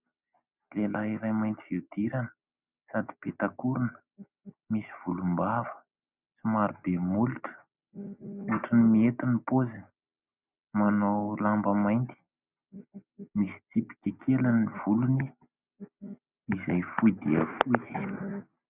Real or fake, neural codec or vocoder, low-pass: real; none; 3.6 kHz